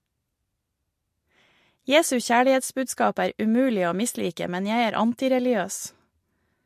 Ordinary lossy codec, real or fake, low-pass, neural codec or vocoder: MP3, 64 kbps; real; 14.4 kHz; none